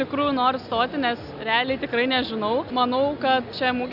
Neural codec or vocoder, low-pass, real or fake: none; 5.4 kHz; real